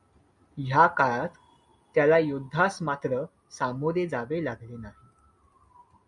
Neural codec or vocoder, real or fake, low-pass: none; real; 10.8 kHz